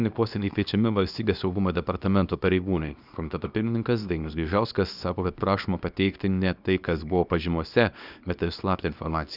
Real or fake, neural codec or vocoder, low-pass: fake; codec, 24 kHz, 0.9 kbps, WavTokenizer, small release; 5.4 kHz